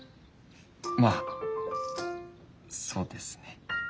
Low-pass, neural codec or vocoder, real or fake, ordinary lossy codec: none; none; real; none